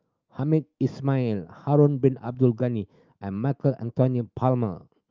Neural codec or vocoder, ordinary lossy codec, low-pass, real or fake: autoencoder, 48 kHz, 128 numbers a frame, DAC-VAE, trained on Japanese speech; Opus, 24 kbps; 7.2 kHz; fake